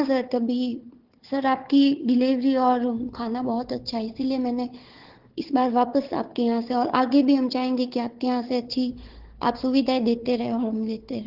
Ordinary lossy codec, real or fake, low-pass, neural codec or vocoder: Opus, 16 kbps; fake; 5.4 kHz; codec, 16 kHz, 4 kbps, FunCodec, trained on Chinese and English, 50 frames a second